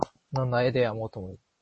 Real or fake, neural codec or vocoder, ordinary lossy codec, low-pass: real; none; MP3, 32 kbps; 9.9 kHz